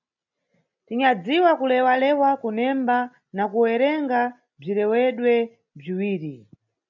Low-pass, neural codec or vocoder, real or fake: 7.2 kHz; none; real